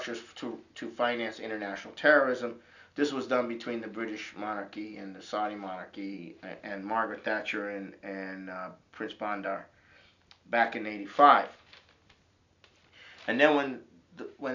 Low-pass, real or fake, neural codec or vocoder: 7.2 kHz; real; none